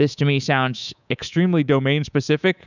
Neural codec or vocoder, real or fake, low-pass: codec, 24 kHz, 3.1 kbps, DualCodec; fake; 7.2 kHz